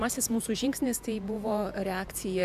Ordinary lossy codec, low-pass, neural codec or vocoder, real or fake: AAC, 96 kbps; 14.4 kHz; vocoder, 48 kHz, 128 mel bands, Vocos; fake